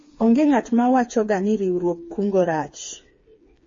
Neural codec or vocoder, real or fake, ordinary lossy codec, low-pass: codec, 16 kHz, 4 kbps, FreqCodec, smaller model; fake; MP3, 32 kbps; 7.2 kHz